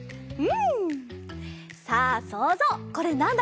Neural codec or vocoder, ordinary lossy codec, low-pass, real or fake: none; none; none; real